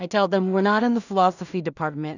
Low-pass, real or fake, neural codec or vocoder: 7.2 kHz; fake; codec, 16 kHz in and 24 kHz out, 0.4 kbps, LongCat-Audio-Codec, two codebook decoder